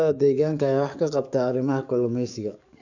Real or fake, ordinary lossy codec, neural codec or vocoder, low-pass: fake; none; codec, 44.1 kHz, 7.8 kbps, DAC; 7.2 kHz